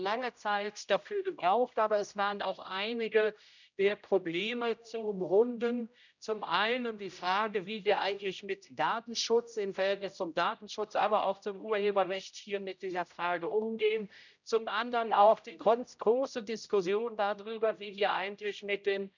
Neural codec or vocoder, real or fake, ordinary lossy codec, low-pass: codec, 16 kHz, 0.5 kbps, X-Codec, HuBERT features, trained on general audio; fake; none; 7.2 kHz